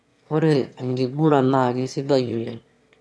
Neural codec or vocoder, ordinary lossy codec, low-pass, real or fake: autoencoder, 22.05 kHz, a latent of 192 numbers a frame, VITS, trained on one speaker; none; none; fake